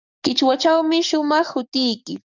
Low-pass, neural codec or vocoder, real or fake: 7.2 kHz; codec, 44.1 kHz, 7.8 kbps, DAC; fake